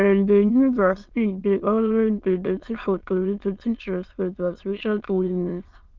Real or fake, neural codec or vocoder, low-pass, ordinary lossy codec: fake; autoencoder, 22.05 kHz, a latent of 192 numbers a frame, VITS, trained on many speakers; 7.2 kHz; Opus, 32 kbps